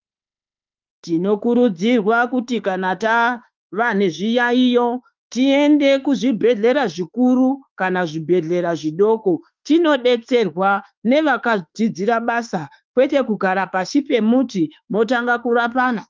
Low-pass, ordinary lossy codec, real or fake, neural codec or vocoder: 7.2 kHz; Opus, 24 kbps; fake; autoencoder, 48 kHz, 32 numbers a frame, DAC-VAE, trained on Japanese speech